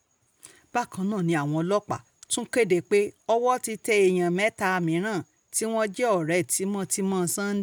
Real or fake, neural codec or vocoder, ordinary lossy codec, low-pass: real; none; none; none